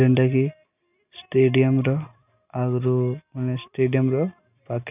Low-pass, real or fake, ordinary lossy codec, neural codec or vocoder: 3.6 kHz; real; none; none